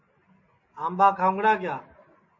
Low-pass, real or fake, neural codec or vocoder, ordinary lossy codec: 7.2 kHz; real; none; MP3, 32 kbps